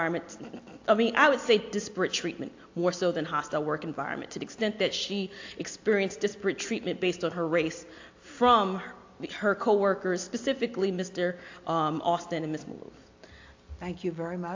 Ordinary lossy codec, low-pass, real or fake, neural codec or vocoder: AAC, 48 kbps; 7.2 kHz; real; none